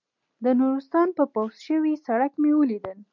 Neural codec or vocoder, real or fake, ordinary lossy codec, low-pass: none; real; MP3, 64 kbps; 7.2 kHz